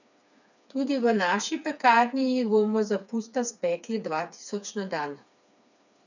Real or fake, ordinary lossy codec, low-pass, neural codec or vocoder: fake; none; 7.2 kHz; codec, 16 kHz, 4 kbps, FreqCodec, smaller model